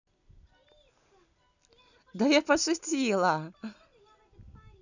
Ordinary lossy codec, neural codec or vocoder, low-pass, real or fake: none; none; 7.2 kHz; real